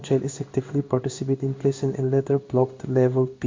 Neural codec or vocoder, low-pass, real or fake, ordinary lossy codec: codec, 16 kHz in and 24 kHz out, 1 kbps, XY-Tokenizer; 7.2 kHz; fake; MP3, 48 kbps